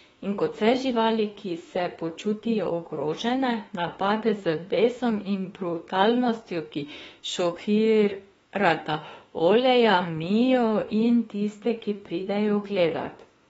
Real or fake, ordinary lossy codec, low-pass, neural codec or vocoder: fake; AAC, 24 kbps; 19.8 kHz; autoencoder, 48 kHz, 32 numbers a frame, DAC-VAE, trained on Japanese speech